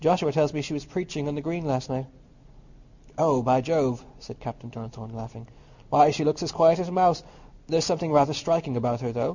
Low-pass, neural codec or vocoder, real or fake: 7.2 kHz; none; real